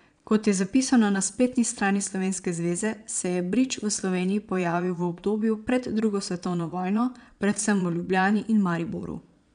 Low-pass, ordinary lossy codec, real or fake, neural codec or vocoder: 9.9 kHz; none; fake; vocoder, 22.05 kHz, 80 mel bands, Vocos